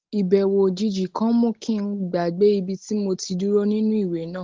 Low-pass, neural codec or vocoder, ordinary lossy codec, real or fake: 7.2 kHz; none; Opus, 16 kbps; real